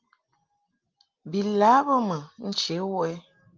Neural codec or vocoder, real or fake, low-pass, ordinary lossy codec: none; real; 7.2 kHz; Opus, 32 kbps